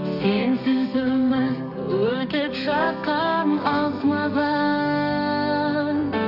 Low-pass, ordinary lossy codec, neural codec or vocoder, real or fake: 5.4 kHz; none; codec, 32 kHz, 1.9 kbps, SNAC; fake